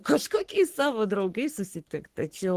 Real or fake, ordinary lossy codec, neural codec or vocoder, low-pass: fake; Opus, 16 kbps; codec, 44.1 kHz, 3.4 kbps, Pupu-Codec; 14.4 kHz